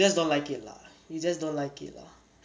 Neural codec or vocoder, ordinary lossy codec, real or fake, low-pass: none; none; real; none